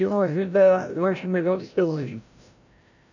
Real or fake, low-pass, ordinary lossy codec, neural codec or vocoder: fake; 7.2 kHz; none; codec, 16 kHz, 0.5 kbps, FreqCodec, larger model